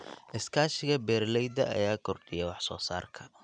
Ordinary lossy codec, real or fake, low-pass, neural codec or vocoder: none; real; 9.9 kHz; none